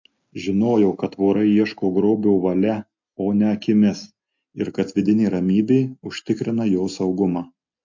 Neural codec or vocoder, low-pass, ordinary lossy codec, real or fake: none; 7.2 kHz; MP3, 48 kbps; real